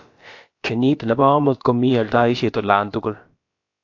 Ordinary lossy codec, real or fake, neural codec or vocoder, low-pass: AAC, 48 kbps; fake; codec, 16 kHz, about 1 kbps, DyCAST, with the encoder's durations; 7.2 kHz